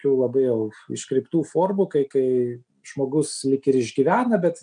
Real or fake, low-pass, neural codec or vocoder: real; 9.9 kHz; none